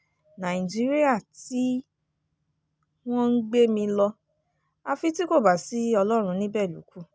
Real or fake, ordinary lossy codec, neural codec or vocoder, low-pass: real; none; none; none